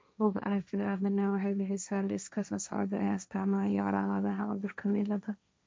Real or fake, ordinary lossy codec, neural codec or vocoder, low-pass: fake; none; codec, 16 kHz, 1.1 kbps, Voila-Tokenizer; none